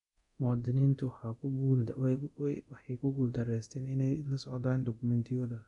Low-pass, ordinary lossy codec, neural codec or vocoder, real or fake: 10.8 kHz; none; codec, 24 kHz, 0.9 kbps, DualCodec; fake